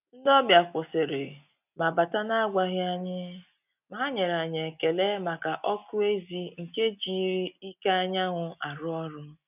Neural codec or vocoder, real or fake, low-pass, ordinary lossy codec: none; real; 3.6 kHz; none